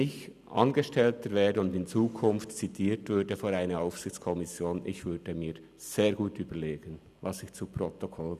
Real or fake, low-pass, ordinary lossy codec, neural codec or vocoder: real; 14.4 kHz; none; none